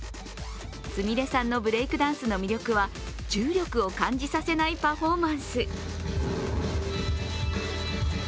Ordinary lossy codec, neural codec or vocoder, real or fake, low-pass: none; none; real; none